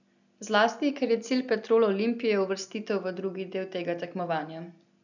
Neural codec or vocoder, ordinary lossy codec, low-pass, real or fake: none; none; 7.2 kHz; real